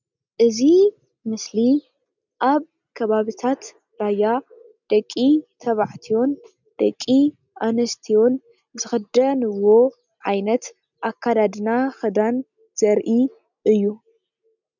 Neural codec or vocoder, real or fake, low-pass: none; real; 7.2 kHz